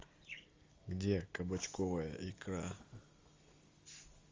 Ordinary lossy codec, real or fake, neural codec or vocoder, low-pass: Opus, 32 kbps; real; none; 7.2 kHz